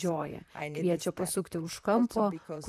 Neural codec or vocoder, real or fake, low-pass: vocoder, 44.1 kHz, 128 mel bands, Pupu-Vocoder; fake; 14.4 kHz